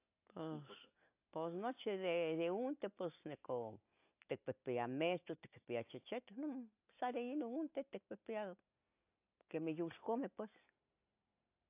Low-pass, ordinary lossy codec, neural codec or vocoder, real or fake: 3.6 kHz; AAC, 32 kbps; none; real